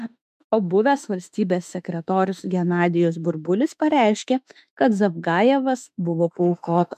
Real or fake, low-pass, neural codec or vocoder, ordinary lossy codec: fake; 14.4 kHz; autoencoder, 48 kHz, 32 numbers a frame, DAC-VAE, trained on Japanese speech; MP3, 96 kbps